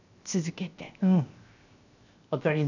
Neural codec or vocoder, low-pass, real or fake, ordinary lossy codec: codec, 16 kHz, 0.7 kbps, FocalCodec; 7.2 kHz; fake; none